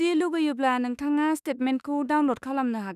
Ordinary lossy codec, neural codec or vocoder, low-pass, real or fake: none; autoencoder, 48 kHz, 32 numbers a frame, DAC-VAE, trained on Japanese speech; 14.4 kHz; fake